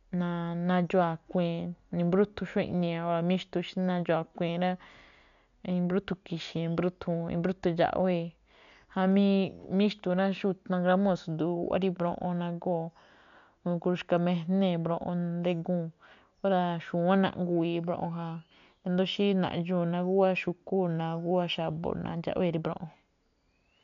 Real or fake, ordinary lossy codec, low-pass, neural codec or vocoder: real; none; 7.2 kHz; none